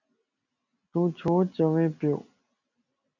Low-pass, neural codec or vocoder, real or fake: 7.2 kHz; none; real